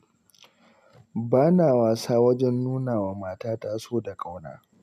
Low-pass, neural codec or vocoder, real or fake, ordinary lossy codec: 14.4 kHz; none; real; MP3, 96 kbps